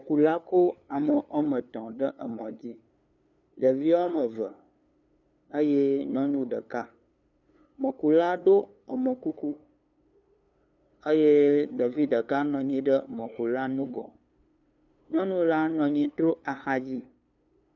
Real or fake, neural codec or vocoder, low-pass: fake; codec, 16 kHz, 2 kbps, FunCodec, trained on LibriTTS, 25 frames a second; 7.2 kHz